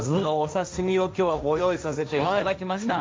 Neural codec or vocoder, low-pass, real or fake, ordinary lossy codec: codec, 16 kHz, 1.1 kbps, Voila-Tokenizer; none; fake; none